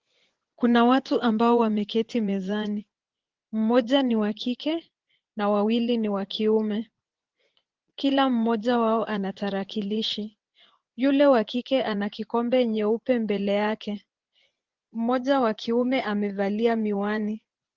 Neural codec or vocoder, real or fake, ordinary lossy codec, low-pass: vocoder, 24 kHz, 100 mel bands, Vocos; fake; Opus, 16 kbps; 7.2 kHz